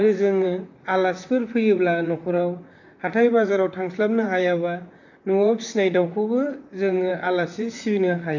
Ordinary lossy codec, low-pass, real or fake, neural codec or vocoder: none; 7.2 kHz; fake; vocoder, 44.1 kHz, 128 mel bands, Pupu-Vocoder